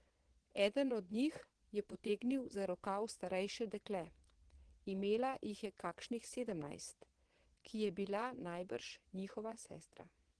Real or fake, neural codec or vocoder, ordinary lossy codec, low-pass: fake; vocoder, 22.05 kHz, 80 mel bands, Vocos; Opus, 16 kbps; 9.9 kHz